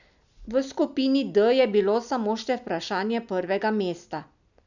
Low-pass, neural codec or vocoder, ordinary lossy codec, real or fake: 7.2 kHz; none; none; real